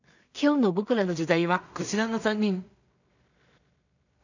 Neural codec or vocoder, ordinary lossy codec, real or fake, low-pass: codec, 16 kHz in and 24 kHz out, 0.4 kbps, LongCat-Audio-Codec, two codebook decoder; none; fake; 7.2 kHz